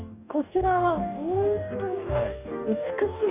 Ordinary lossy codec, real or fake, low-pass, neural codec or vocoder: none; fake; 3.6 kHz; codec, 44.1 kHz, 2.6 kbps, DAC